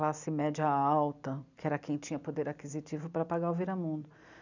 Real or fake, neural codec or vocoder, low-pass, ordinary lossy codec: real; none; 7.2 kHz; none